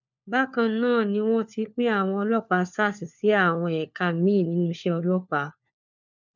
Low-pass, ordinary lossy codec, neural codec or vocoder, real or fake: 7.2 kHz; none; codec, 16 kHz, 4 kbps, FunCodec, trained on LibriTTS, 50 frames a second; fake